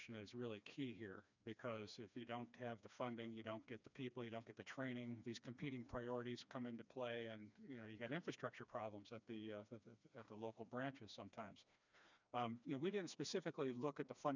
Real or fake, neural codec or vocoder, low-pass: fake; codec, 16 kHz, 2 kbps, FreqCodec, smaller model; 7.2 kHz